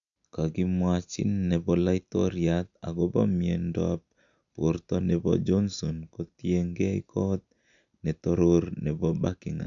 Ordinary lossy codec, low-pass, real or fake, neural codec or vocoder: none; 7.2 kHz; real; none